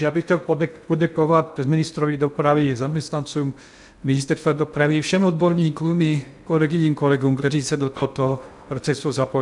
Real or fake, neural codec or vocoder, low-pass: fake; codec, 16 kHz in and 24 kHz out, 0.6 kbps, FocalCodec, streaming, 2048 codes; 10.8 kHz